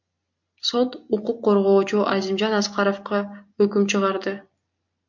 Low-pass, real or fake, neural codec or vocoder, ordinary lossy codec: 7.2 kHz; real; none; MP3, 48 kbps